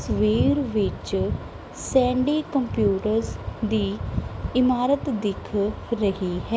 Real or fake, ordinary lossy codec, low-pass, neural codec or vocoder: real; none; none; none